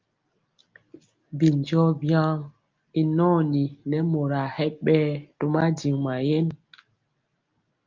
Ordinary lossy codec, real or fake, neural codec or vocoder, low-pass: Opus, 32 kbps; real; none; 7.2 kHz